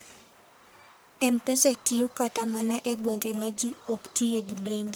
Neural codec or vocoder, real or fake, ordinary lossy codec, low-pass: codec, 44.1 kHz, 1.7 kbps, Pupu-Codec; fake; none; none